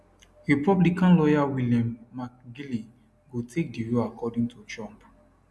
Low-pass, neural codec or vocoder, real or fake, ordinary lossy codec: none; none; real; none